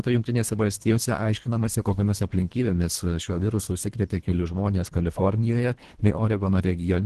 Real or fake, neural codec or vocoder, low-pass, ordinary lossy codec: fake; codec, 24 kHz, 1.5 kbps, HILCodec; 10.8 kHz; Opus, 16 kbps